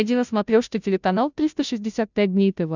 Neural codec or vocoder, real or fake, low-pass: codec, 16 kHz, 0.5 kbps, FunCodec, trained on Chinese and English, 25 frames a second; fake; 7.2 kHz